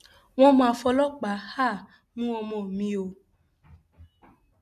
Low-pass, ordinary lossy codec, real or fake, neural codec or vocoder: 14.4 kHz; none; real; none